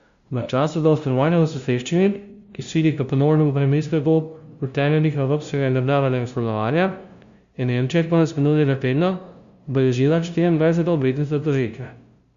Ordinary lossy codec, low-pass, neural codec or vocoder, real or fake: Opus, 64 kbps; 7.2 kHz; codec, 16 kHz, 0.5 kbps, FunCodec, trained on LibriTTS, 25 frames a second; fake